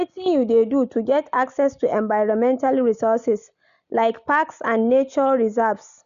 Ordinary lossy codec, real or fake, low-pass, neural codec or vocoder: none; real; 7.2 kHz; none